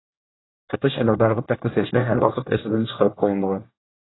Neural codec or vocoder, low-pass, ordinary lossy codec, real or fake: codec, 24 kHz, 1 kbps, SNAC; 7.2 kHz; AAC, 16 kbps; fake